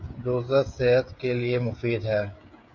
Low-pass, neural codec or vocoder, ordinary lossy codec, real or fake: 7.2 kHz; codec, 16 kHz, 16 kbps, FreqCodec, smaller model; MP3, 64 kbps; fake